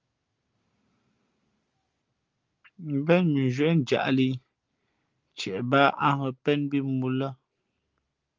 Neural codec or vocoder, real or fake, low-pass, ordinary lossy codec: none; real; 7.2 kHz; Opus, 32 kbps